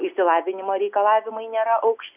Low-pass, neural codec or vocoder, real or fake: 3.6 kHz; none; real